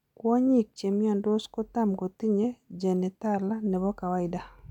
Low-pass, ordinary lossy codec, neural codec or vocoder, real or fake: 19.8 kHz; none; none; real